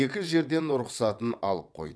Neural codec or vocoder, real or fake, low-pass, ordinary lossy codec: vocoder, 22.05 kHz, 80 mel bands, WaveNeXt; fake; none; none